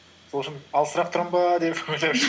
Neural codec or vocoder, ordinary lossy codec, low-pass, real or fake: none; none; none; real